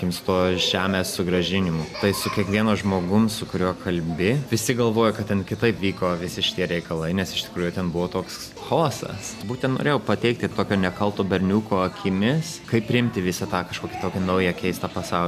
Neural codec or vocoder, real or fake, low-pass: none; real; 14.4 kHz